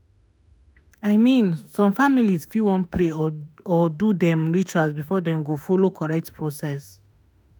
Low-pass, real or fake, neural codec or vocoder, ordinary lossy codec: none; fake; autoencoder, 48 kHz, 32 numbers a frame, DAC-VAE, trained on Japanese speech; none